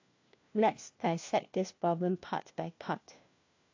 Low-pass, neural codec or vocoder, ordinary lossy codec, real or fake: 7.2 kHz; codec, 16 kHz, 1 kbps, FunCodec, trained on LibriTTS, 50 frames a second; AAC, 48 kbps; fake